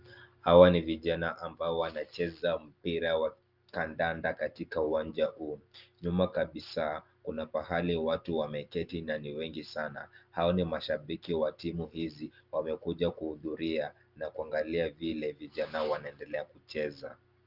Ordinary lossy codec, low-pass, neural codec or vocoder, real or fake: Opus, 24 kbps; 5.4 kHz; none; real